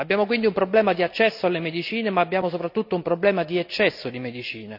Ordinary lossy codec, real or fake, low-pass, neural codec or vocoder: none; real; 5.4 kHz; none